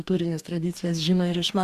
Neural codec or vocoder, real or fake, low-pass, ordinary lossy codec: codec, 44.1 kHz, 2.6 kbps, DAC; fake; 14.4 kHz; Opus, 64 kbps